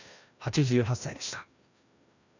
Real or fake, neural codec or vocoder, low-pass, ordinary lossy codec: fake; codec, 16 kHz, 1 kbps, FreqCodec, larger model; 7.2 kHz; none